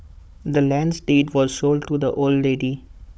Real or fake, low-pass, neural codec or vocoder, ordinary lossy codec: fake; none; codec, 16 kHz, 16 kbps, FunCodec, trained on Chinese and English, 50 frames a second; none